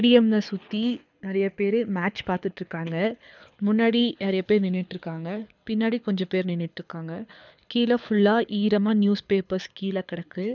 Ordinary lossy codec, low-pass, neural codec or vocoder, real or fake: none; 7.2 kHz; codec, 24 kHz, 6 kbps, HILCodec; fake